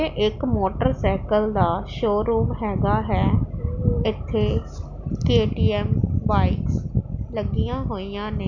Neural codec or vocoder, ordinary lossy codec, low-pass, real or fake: none; none; 7.2 kHz; real